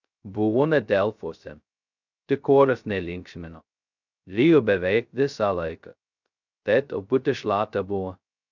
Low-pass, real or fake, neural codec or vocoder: 7.2 kHz; fake; codec, 16 kHz, 0.2 kbps, FocalCodec